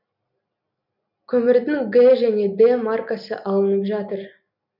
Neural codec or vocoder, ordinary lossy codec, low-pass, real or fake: none; none; 5.4 kHz; real